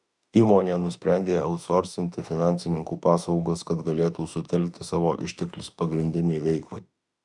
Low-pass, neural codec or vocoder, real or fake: 10.8 kHz; autoencoder, 48 kHz, 32 numbers a frame, DAC-VAE, trained on Japanese speech; fake